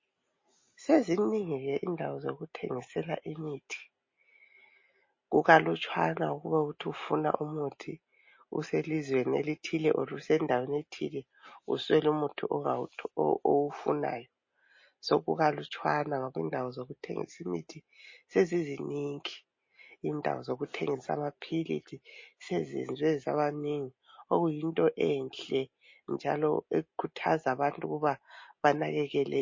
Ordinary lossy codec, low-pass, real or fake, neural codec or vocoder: MP3, 32 kbps; 7.2 kHz; real; none